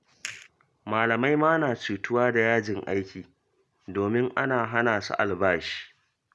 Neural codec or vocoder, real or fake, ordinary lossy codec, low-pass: none; real; none; none